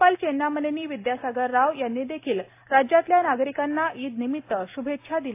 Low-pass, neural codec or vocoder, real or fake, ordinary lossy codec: 3.6 kHz; none; real; AAC, 24 kbps